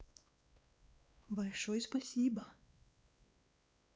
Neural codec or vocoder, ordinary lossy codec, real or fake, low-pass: codec, 16 kHz, 2 kbps, X-Codec, WavLM features, trained on Multilingual LibriSpeech; none; fake; none